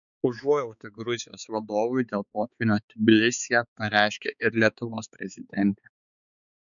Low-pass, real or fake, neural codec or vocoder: 7.2 kHz; fake; codec, 16 kHz, 4 kbps, X-Codec, HuBERT features, trained on balanced general audio